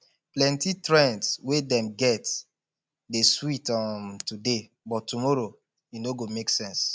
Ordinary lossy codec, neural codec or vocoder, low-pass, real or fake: none; none; none; real